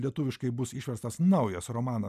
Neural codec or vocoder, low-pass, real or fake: none; 14.4 kHz; real